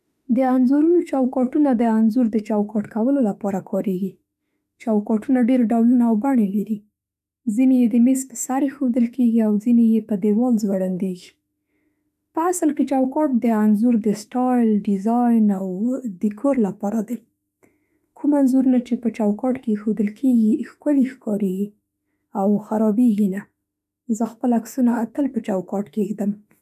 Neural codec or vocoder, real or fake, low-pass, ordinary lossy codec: autoencoder, 48 kHz, 32 numbers a frame, DAC-VAE, trained on Japanese speech; fake; 14.4 kHz; none